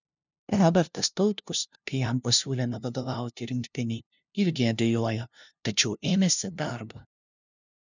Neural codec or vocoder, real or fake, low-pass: codec, 16 kHz, 0.5 kbps, FunCodec, trained on LibriTTS, 25 frames a second; fake; 7.2 kHz